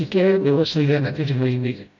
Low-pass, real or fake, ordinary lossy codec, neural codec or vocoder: 7.2 kHz; fake; none; codec, 16 kHz, 0.5 kbps, FreqCodec, smaller model